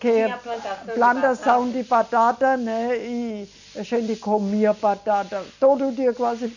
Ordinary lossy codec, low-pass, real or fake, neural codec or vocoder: none; 7.2 kHz; real; none